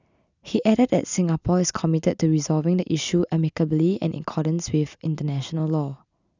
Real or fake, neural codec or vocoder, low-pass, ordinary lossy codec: real; none; 7.2 kHz; none